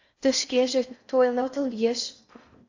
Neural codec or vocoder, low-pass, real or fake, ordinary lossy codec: codec, 16 kHz in and 24 kHz out, 0.6 kbps, FocalCodec, streaming, 4096 codes; 7.2 kHz; fake; AAC, 48 kbps